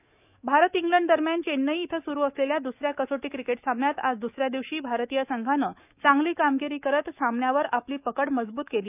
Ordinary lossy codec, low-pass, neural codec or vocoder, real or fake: none; 3.6 kHz; autoencoder, 48 kHz, 128 numbers a frame, DAC-VAE, trained on Japanese speech; fake